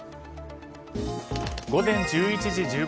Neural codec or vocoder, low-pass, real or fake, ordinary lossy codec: none; none; real; none